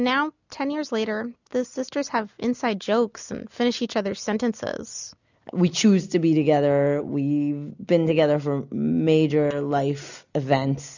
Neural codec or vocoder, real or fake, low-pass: none; real; 7.2 kHz